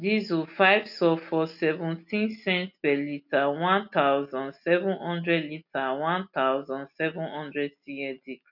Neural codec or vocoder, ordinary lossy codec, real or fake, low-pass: none; none; real; 5.4 kHz